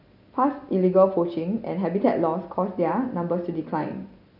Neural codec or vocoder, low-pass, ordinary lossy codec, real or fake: none; 5.4 kHz; none; real